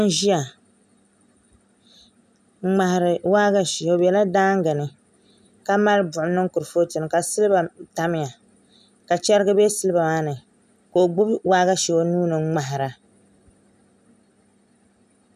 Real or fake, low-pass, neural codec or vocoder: real; 14.4 kHz; none